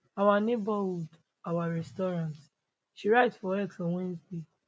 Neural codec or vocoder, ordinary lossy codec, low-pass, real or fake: none; none; none; real